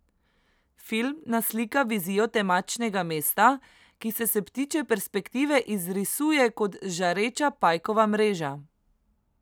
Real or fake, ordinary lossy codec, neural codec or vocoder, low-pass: real; none; none; none